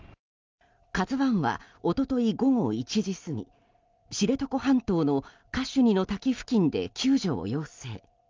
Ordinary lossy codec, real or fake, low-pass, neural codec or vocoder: Opus, 32 kbps; real; 7.2 kHz; none